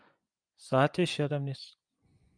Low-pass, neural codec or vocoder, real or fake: 9.9 kHz; vocoder, 24 kHz, 100 mel bands, Vocos; fake